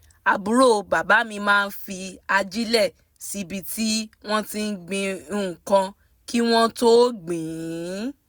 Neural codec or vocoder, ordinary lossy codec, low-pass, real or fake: none; none; none; real